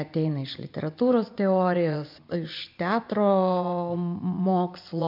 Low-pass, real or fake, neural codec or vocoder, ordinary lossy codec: 5.4 kHz; fake; vocoder, 24 kHz, 100 mel bands, Vocos; MP3, 48 kbps